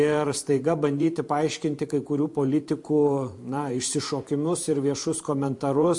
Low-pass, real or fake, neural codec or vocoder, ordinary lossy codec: 10.8 kHz; fake; vocoder, 44.1 kHz, 128 mel bands every 512 samples, BigVGAN v2; MP3, 48 kbps